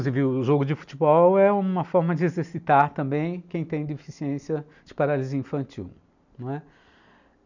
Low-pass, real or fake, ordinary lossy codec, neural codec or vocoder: 7.2 kHz; real; none; none